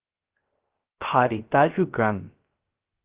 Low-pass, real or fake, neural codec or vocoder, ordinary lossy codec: 3.6 kHz; fake; codec, 16 kHz, 0.3 kbps, FocalCodec; Opus, 16 kbps